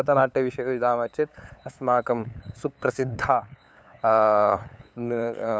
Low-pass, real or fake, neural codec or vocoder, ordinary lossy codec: none; fake; codec, 16 kHz, 8 kbps, FunCodec, trained on LibriTTS, 25 frames a second; none